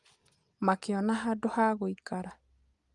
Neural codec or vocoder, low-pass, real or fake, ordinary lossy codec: none; 10.8 kHz; real; Opus, 32 kbps